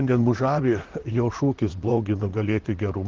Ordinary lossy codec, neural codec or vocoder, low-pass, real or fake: Opus, 16 kbps; vocoder, 44.1 kHz, 128 mel bands, Pupu-Vocoder; 7.2 kHz; fake